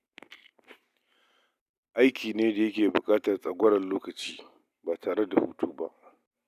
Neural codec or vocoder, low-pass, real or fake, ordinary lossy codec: none; 14.4 kHz; real; none